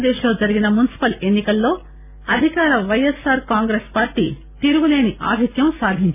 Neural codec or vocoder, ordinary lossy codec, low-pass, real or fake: none; none; 3.6 kHz; real